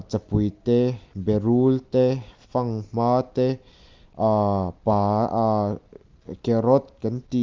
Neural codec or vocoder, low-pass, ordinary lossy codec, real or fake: none; 7.2 kHz; Opus, 32 kbps; real